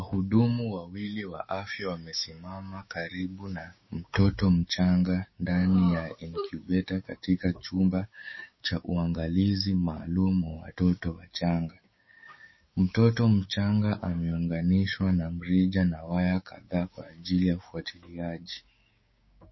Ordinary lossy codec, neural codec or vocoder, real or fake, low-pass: MP3, 24 kbps; codec, 16 kHz, 6 kbps, DAC; fake; 7.2 kHz